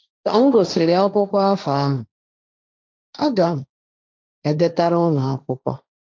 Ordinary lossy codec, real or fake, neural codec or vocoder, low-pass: none; fake; codec, 16 kHz, 1.1 kbps, Voila-Tokenizer; none